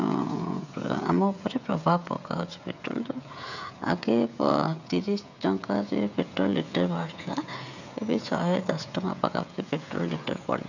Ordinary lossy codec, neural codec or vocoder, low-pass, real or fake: none; codec, 16 kHz, 16 kbps, FreqCodec, smaller model; 7.2 kHz; fake